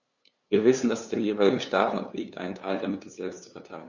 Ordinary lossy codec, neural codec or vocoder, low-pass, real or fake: Opus, 64 kbps; codec, 16 kHz, 2 kbps, FunCodec, trained on LibriTTS, 25 frames a second; 7.2 kHz; fake